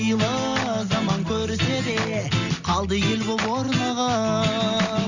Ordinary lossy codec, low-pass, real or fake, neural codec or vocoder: none; 7.2 kHz; real; none